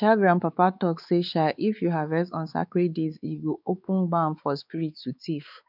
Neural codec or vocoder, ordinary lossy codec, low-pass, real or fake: codec, 16 kHz, 4 kbps, X-Codec, WavLM features, trained on Multilingual LibriSpeech; none; 5.4 kHz; fake